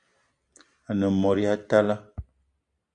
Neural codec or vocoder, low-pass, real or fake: none; 9.9 kHz; real